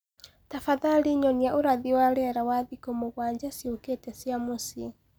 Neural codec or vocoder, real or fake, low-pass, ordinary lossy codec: none; real; none; none